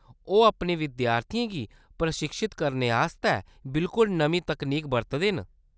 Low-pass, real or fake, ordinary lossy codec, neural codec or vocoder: none; real; none; none